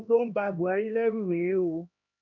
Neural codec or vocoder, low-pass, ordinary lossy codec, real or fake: codec, 16 kHz, 1 kbps, X-Codec, HuBERT features, trained on LibriSpeech; 7.2 kHz; none; fake